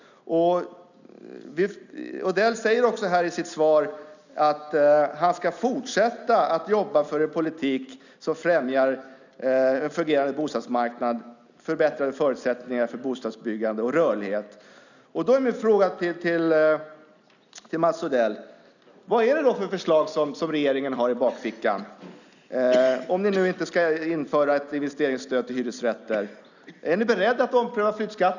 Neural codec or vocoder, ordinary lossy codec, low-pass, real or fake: none; none; 7.2 kHz; real